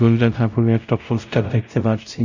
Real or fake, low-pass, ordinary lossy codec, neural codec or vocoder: fake; 7.2 kHz; none; codec, 16 kHz, 0.5 kbps, X-Codec, WavLM features, trained on Multilingual LibriSpeech